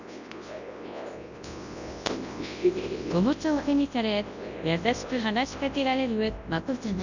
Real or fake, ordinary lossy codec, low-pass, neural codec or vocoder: fake; none; 7.2 kHz; codec, 24 kHz, 0.9 kbps, WavTokenizer, large speech release